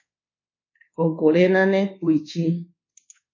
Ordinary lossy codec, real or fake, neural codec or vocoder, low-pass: MP3, 32 kbps; fake; codec, 24 kHz, 1.2 kbps, DualCodec; 7.2 kHz